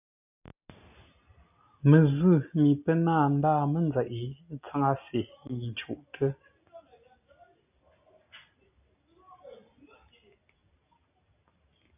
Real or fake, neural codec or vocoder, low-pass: real; none; 3.6 kHz